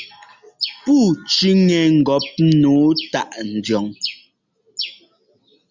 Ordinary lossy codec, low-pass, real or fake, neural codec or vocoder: Opus, 64 kbps; 7.2 kHz; real; none